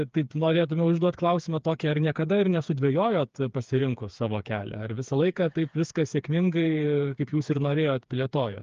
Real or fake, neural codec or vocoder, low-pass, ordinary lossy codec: fake; codec, 16 kHz, 4 kbps, FreqCodec, smaller model; 7.2 kHz; Opus, 32 kbps